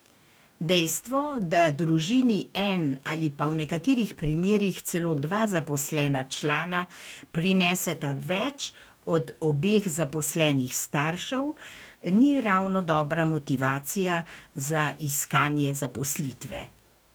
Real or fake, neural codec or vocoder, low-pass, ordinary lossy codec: fake; codec, 44.1 kHz, 2.6 kbps, DAC; none; none